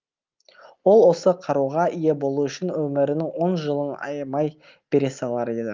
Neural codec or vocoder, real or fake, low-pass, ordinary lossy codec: none; real; 7.2 kHz; Opus, 32 kbps